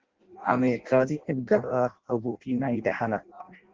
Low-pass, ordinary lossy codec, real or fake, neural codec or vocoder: 7.2 kHz; Opus, 16 kbps; fake; codec, 16 kHz in and 24 kHz out, 0.6 kbps, FireRedTTS-2 codec